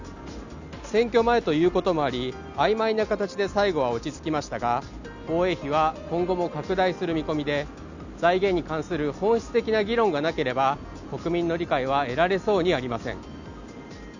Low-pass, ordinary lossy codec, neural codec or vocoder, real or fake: 7.2 kHz; none; none; real